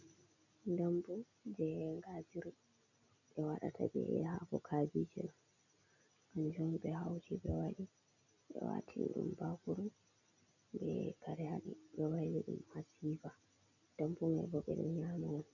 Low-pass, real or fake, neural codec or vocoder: 7.2 kHz; real; none